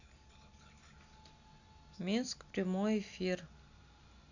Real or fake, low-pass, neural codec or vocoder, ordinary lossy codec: real; 7.2 kHz; none; none